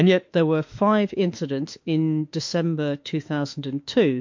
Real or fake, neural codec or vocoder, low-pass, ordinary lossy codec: fake; autoencoder, 48 kHz, 32 numbers a frame, DAC-VAE, trained on Japanese speech; 7.2 kHz; MP3, 48 kbps